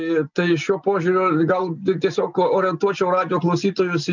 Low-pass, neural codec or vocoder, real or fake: 7.2 kHz; none; real